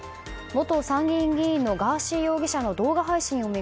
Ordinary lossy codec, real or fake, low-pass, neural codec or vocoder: none; real; none; none